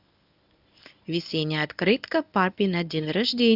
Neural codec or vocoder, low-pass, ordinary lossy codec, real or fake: codec, 24 kHz, 0.9 kbps, WavTokenizer, medium speech release version 1; 5.4 kHz; none; fake